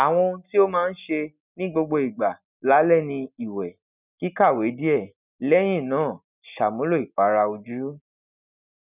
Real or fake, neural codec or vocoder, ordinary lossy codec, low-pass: real; none; none; 3.6 kHz